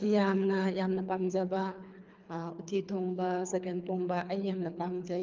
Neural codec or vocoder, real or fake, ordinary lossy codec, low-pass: codec, 24 kHz, 3 kbps, HILCodec; fake; Opus, 24 kbps; 7.2 kHz